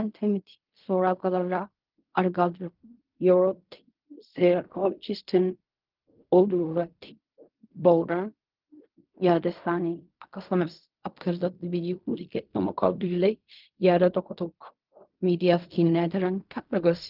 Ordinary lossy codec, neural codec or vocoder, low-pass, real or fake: Opus, 24 kbps; codec, 16 kHz in and 24 kHz out, 0.4 kbps, LongCat-Audio-Codec, fine tuned four codebook decoder; 5.4 kHz; fake